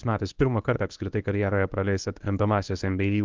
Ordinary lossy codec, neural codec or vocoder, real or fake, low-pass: Opus, 32 kbps; codec, 24 kHz, 0.9 kbps, WavTokenizer, medium speech release version 1; fake; 7.2 kHz